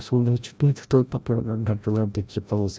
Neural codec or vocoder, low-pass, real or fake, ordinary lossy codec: codec, 16 kHz, 0.5 kbps, FreqCodec, larger model; none; fake; none